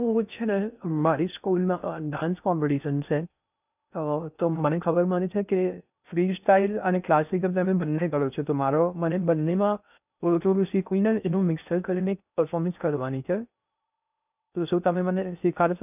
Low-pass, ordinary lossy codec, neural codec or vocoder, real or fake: 3.6 kHz; none; codec, 16 kHz in and 24 kHz out, 0.6 kbps, FocalCodec, streaming, 2048 codes; fake